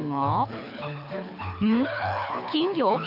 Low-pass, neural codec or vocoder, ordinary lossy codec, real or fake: 5.4 kHz; codec, 24 kHz, 6 kbps, HILCodec; none; fake